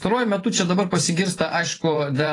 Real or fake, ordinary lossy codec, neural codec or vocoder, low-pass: fake; AAC, 32 kbps; vocoder, 44.1 kHz, 128 mel bands every 512 samples, BigVGAN v2; 10.8 kHz